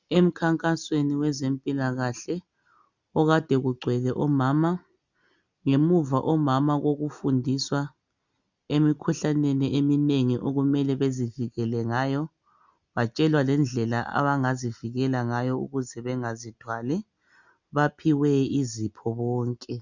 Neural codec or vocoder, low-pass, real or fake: none; 7.2 kHz; real